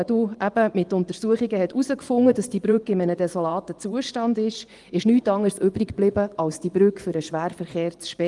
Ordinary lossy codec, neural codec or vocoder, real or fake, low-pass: Opus, 24 kbps; vocoder, 24 kHz, 100 mel bands, Vocos; fake; 10.8 kHz